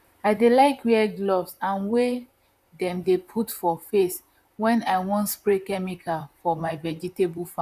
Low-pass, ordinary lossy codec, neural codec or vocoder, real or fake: 14.4 kHz; none; vocoder, 44.1 kHz, 128 mel bands, Pupu-Vocoder; fake